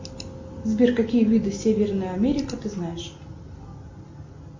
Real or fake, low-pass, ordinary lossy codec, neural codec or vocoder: real; 7.2 kHz; MP3, 64 kbps; none